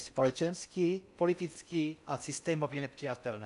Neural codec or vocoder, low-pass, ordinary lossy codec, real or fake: codec, 16 kHz in and 24 kHz out, 0.6 kbps, FocalCodec, streaming, 4096 codes; 10.8 kHz; AAC, 96 kbps; fake